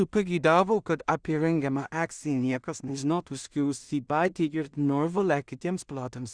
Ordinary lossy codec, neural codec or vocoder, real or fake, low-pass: Opus, 64 kbps; codec, 16 kHz in and 24 kHz out, 0.4 kbps, LongCat-Audio-Codec, two codebook decoder; fake; 9.9 kHz